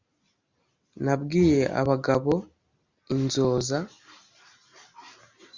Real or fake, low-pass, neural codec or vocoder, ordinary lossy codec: real; 7.2 kHz; none; Opus, 64 kbps